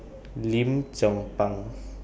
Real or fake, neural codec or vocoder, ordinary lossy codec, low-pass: real; none; none; none